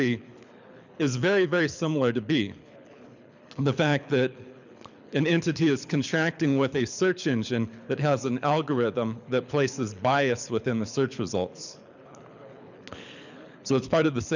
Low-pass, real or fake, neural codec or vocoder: 7.2 kHz; fake; codec, 24 kHz, 6 kbps, HILCodec